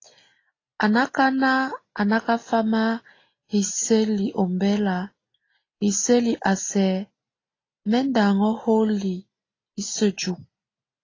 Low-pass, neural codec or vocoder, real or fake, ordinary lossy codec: 7.2 kHz; none; real; AAC, 32 kbps